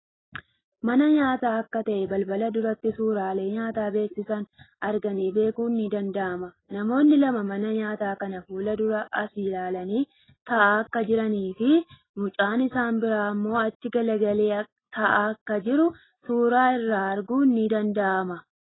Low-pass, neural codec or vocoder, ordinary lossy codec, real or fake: 7.2 kHz; none; AAC, 16 kbps; real